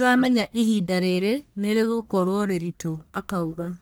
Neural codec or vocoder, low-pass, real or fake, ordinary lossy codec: codec, 44.1 kHz, 1.7 kbps, Pupu-Codec; none; fake; none